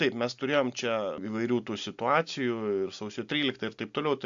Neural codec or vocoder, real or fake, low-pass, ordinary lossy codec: none; real; 7.2 kHz; AAC, 64 kbps